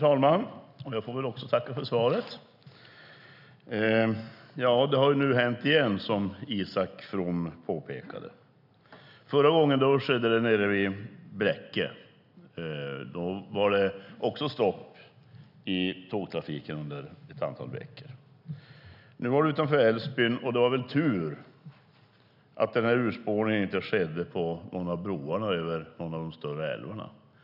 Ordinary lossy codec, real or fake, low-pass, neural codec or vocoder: none; real; 5.4 kHz; none